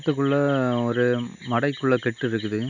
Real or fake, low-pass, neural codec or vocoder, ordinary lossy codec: real; 7.2 kHz; none; none